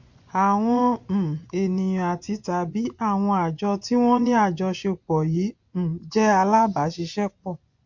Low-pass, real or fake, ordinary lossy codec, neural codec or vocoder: 7.2 kHz; fake; MP3, 48 kbps; vocoder, 24 kHz, 100 mel bands, Vocos